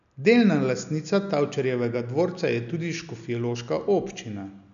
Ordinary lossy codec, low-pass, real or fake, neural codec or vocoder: none; 7.2 kHz; real; none